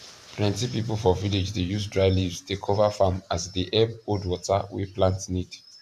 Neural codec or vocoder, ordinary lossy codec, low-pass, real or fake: vocoder, 44.1 kHz, 128 mel bands every 512 samples, BigVGAN v2; none; 14.4 kHz; fake